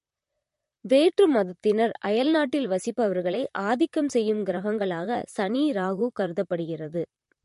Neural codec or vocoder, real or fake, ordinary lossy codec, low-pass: vocoder, 44.1 kHz, 128 mel bands, Pupu-Vocoder; fake; MP3, 48 kbps; 14.4 kHz